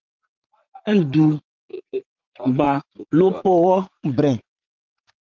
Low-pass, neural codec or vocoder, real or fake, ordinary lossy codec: 7.2 kHz; vocoder, 44.1 kHz, 80 mel bands, Vocos; fake; Opus, 24 kbps